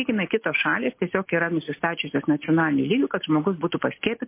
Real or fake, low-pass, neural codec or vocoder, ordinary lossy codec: real; 3.6 kHz; none; MP3, 24 kbps